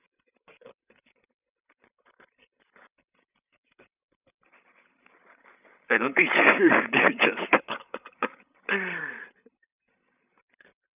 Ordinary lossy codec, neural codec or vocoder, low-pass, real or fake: none; codec, 16 kHz, 16 kbps, FreqCodec, larger model; 3.6 kHz; fake